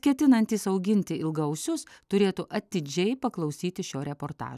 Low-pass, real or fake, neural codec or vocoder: 14.4 kHz; real; none